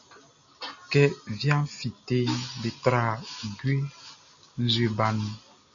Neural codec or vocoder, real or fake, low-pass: none; real; 7.2 kHz